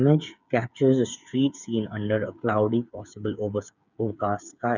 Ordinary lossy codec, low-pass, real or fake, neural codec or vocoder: none; 7.2 kHz; fake; codec, 16 kHz, 8 kbps, FreqCodec, smaller model